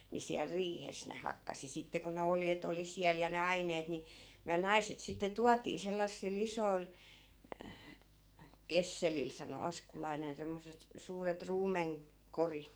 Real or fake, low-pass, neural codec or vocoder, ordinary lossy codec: fake; none; codec, 44.1 kHz, 2.6 kbps, SNAC; none